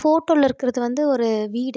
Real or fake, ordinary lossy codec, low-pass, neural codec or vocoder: real; none; none; none